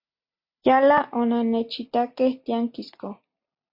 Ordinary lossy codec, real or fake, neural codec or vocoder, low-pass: MP3, 32 kbps; real; none; 5.4 kHz